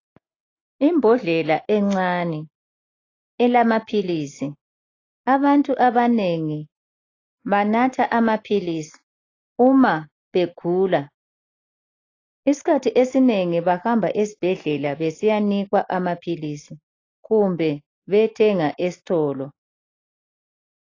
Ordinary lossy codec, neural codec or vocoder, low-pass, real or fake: AAC, 32 kbps; none; 7.2 kHz; real